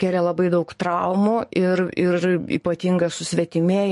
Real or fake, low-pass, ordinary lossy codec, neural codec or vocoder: fake; 14.4 kHz; MP3, 48 kbps; codec, 44.1 kHz, 7.8 kbps, DAC